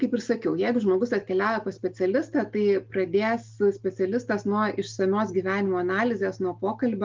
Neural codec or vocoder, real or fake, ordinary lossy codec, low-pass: none; real; Opus, 32 kbps; 7.2 kHz